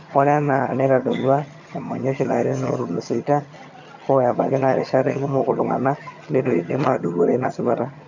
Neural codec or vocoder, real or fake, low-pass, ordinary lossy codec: vocoder, 22.05 kHz, 80 mel bands, HiFi-GAN; fake; 7.2 kHz; none